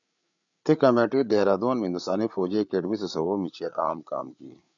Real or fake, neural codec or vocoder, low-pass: fake; codec, 16 kHz, 8 kbps, FreqCodec, larger model; 7.2 kHz